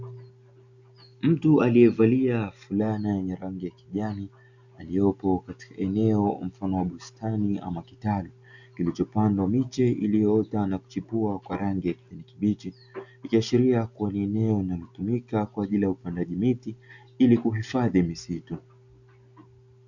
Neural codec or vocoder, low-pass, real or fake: none; 7.2 kHz; real